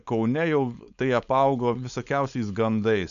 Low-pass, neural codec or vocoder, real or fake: 7.2 kHz; codec, 16 kHz, 4.8 kbps, FACodec; fake